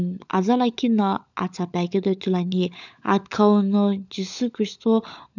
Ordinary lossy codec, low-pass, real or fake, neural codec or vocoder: none; 7.2 kHz; fake; codec, 16 kHz, 16 kbps, FunCodec, trained on LibriTTS, 50 frames a second